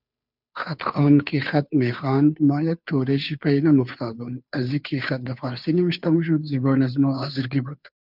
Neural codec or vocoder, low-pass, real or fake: codec, 16 kHz, 2 kbps, FunCodec, trained on Chinese and English, 25 frames a second; 5.4 kHz; fake